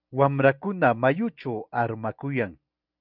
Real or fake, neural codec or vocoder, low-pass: real; none; 5.4 kHz